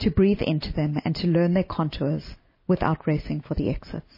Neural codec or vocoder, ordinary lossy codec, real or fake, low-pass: none; MP3, 24 kbps; real; 5.4 kHz